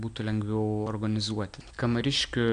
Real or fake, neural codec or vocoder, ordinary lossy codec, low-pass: real; none; AAC, 64 kbps; 9.9 kHz